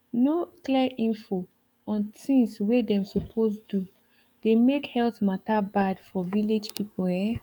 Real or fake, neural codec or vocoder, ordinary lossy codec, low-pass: fake; codec, 44.1 kHz, 7.8 kbps, DAC; none; 19.8 kHz